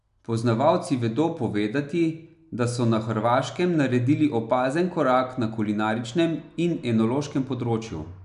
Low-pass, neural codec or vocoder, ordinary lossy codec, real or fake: 10.8 kHz; none; none; real